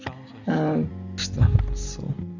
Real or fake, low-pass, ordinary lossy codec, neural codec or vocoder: real; 7.2 kHz; none; none